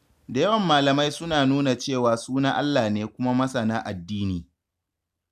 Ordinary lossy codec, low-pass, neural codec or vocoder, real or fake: none; 14.4 kHz; none; real